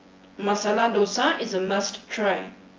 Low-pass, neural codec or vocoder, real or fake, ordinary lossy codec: 7.2 kHz; vocoder, 24 kHz, 100 mel bands, Vocos; fake; Opus, 24 kbps